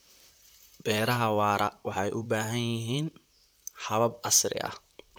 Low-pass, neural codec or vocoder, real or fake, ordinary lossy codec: none; vocoder, 44.1 kHz, 128 mel bands, Pupu-Vocoder; fake; none